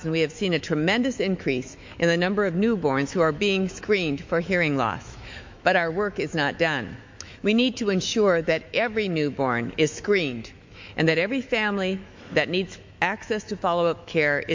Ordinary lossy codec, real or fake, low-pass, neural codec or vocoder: MP3, 48 kbps; fake; 7.2 kHz; autoencoder, 48 kHz, 128 numbers a frame, DAC-VAE, trained on Japanese speech